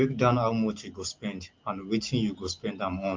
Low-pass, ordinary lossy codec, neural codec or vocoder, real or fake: 7.2 kHz; Opus, 32 kbps; none; real